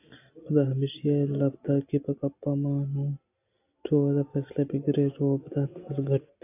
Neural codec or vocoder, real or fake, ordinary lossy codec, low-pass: none; real; AAC, 24 kbps; 3.6 kHz